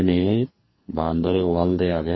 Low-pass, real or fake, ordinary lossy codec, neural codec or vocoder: 7.2 kHz; fake; MP3, 24 kbps; codec, 44.1 kHz, 2.6 kbps, SNAC